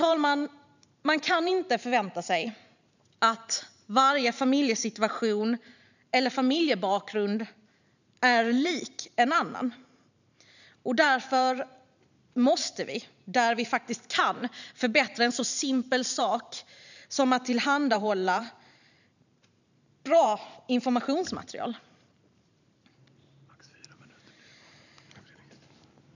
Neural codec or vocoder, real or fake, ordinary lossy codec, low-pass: vocoder, 44.1 kHz, 128 mel bands every 512 samples, BigVGAN v2; fake; none; 7.2 kHz